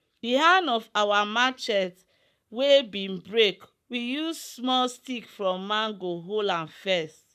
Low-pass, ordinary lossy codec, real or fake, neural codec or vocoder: 14.4 kHz; none; fake; codec, 44.1 kHz, 7.8 kbps, Pupu-Codec